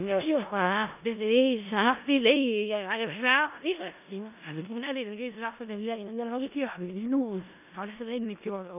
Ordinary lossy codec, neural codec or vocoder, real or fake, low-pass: none; codec, 16 kHz in and 24 kHz out, 0.4 kbps, LongCat-Audio-Codec, four codebook decoder; fake; 3.6 kHz